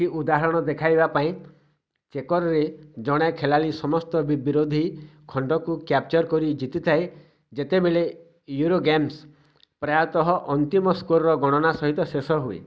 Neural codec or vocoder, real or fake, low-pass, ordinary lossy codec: none; real; none; none